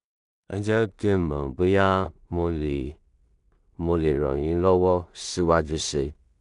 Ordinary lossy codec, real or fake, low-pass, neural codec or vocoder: MP3, 96 kbps; fake; 10.8 kHz; codec, 16 kHz in and 24 kHz out, 0.4 kbps, LongCat-Audio-Codec, two codebook decoder